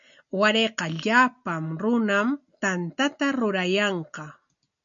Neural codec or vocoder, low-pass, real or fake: none; 7.2 kHz; real